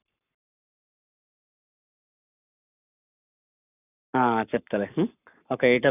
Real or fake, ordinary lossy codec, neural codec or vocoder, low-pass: fake; none; codec, 44.1 kHz, 7.8 kbps, Pupu-Codec; 3.6 kHz